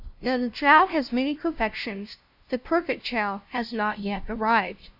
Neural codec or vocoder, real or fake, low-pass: codec, 16 kHz, 0.5 kbps, FunCodec, trained on LibriTTS, 25 frames a second; fake; 5.4 kHz